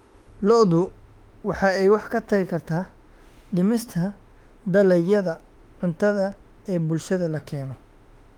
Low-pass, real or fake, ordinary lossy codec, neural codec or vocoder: 19.8 kHz; fake; Opus, 32 kbps; autoencoder, 48 kHz, 32 numbers a frame, DAC-VAE, trained on Japanese speech